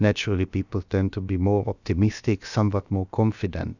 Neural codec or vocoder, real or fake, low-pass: codec, 16 kHz, about 1 kbps, DyCAST, with the encoder's durations; fake; 7.2 kHz